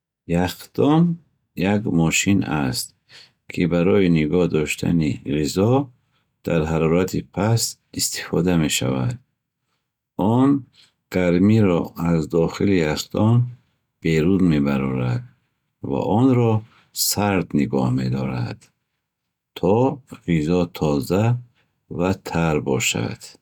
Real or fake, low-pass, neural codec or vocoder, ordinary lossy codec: real; 19.8 kHz; none; none